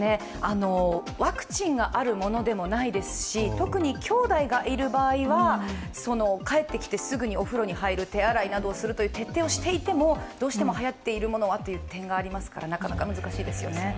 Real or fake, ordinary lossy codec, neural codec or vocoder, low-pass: real; none; none; none